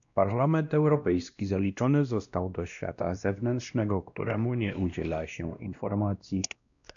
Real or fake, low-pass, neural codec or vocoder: fake; 7.2 kHz; codec, 16 kHz, 1 kbps, X-Codec, WavLM features, trained on Multilingual LibriSpeech